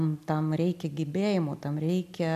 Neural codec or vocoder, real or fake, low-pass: none; real; 14.4 kHz